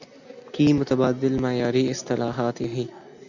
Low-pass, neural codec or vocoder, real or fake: 7.2 kHz; none; real